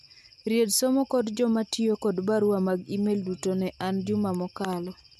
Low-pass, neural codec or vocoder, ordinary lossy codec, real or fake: 14.4 kHz; none; MP3, 96 kbps; real